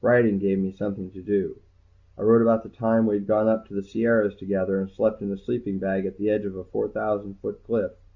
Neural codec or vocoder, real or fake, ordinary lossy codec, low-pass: none; real; Opus, 64 kbps; 7.2 kHz